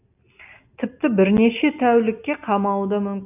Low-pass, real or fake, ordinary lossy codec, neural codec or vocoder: 3.6 kHz; real; AAC, 32 kbps; none